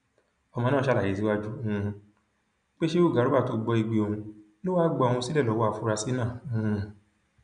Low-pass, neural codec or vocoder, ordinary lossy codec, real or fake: 9.9 kHz; none; none; real